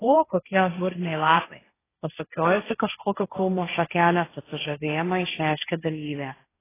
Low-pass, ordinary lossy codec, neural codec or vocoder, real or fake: 3.6 kHz; AAC, 16 kbps; codec, 16 kHz, 1.1 kbps, Voila-Tokenizer; fake